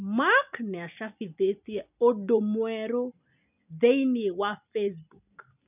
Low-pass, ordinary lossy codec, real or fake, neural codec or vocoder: 3.6 kHz; none; real; none